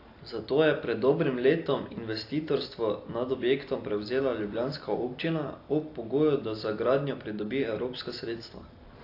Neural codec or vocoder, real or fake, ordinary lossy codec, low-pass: none; real; AAC, 32 kbps; 5.4 kHz